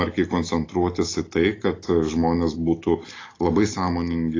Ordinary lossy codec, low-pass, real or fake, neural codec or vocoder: AAC, 32 kbps; 7.2 kHz; real; none